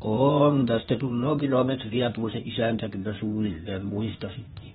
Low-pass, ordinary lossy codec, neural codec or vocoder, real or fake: 7.2 kHz; AAC, 16 kbps; codec, 16 kHz, 0.8 kbps, ZipCodec; fake